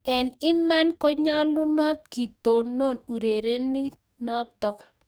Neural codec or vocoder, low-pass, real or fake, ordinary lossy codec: codec, 44.1 kHz, 2.6 kbps, DAC; none; fake; none